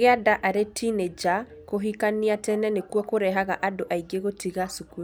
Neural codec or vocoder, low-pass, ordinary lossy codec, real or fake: none; none; none; real